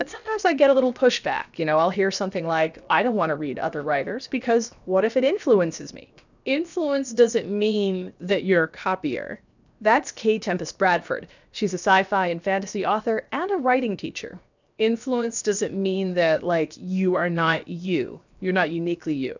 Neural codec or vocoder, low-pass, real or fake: codec, 16 kHz, 0.7 kbps, FocalCodec; 7.2 kHz; fake